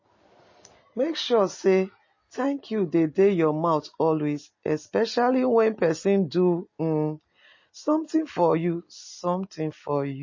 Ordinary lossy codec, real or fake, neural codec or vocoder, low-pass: MP3, 32 kbps; real; none; 7.2 kHz